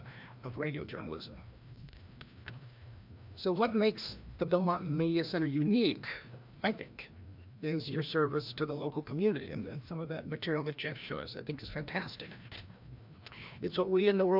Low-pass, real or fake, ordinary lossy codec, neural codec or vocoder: 5.4 kHz; fake; MP3, 48 kbps; codec, 16 kHz, 1 kbps, FreqCodec, larger model